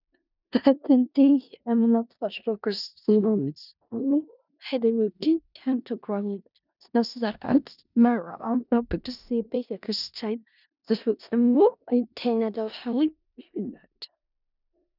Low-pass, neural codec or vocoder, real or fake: 5.4 kHz; codec, 16 kHz in and 24 kHz out, 0.4 kbps, LongCat-Audio-Codec, four codebook decoder; fake